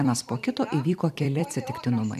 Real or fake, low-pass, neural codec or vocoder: fake; 14.4 kHz; vocoder, 44.1 kHz, 128 mel bands every 256 samples, BigVGAN v2